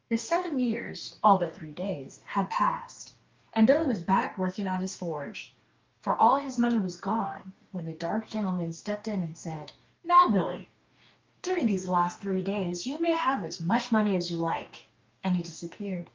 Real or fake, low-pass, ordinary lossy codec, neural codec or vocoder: fake; 7.2 kHz; Opus, 24 kbps; codec, 44.1 kHz, 2.6 kbps, DAC